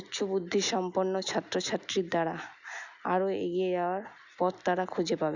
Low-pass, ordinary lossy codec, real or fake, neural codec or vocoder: 7.2 kHz; none; real; none